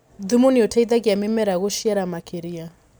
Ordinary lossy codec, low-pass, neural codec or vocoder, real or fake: none; none; none; real